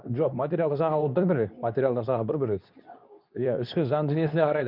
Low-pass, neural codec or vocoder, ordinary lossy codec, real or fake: 5.4 kHz; codec, 24 kHz, 0.9 kbps, WavTokenizer, medium speech release version 2; none; fake